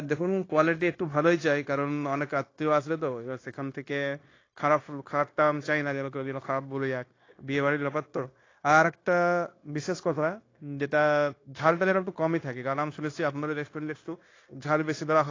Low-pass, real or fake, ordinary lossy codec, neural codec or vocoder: 7.2 kHz; fake; AAC, 32 kbps; codec, 16 kHz, 0.9 kbps, LongCat-Audio-Codec